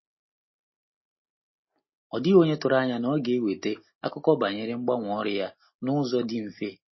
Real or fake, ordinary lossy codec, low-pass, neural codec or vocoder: real; MP3, 24 kbps; 7.2 kHz; none